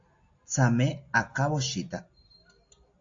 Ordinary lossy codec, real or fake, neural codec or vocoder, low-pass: AAC, 48 kbps; real; none; 7.2 kHz